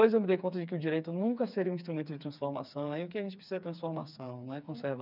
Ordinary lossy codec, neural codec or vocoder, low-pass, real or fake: none; codec, 16 kHz, 4 kbps, FreqCodec, smaller model; 5.4 kHz; fake